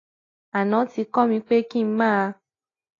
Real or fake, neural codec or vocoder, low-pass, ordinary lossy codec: real; none; 7.2 kHz; AAC, 32 kbps